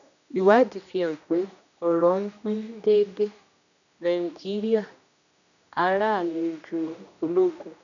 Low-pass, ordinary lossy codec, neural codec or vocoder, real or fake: 7.2 kHz; none; codec, 16 kHz, 1 kbps, X-Codec, HuBERT features, trained on general audio; fake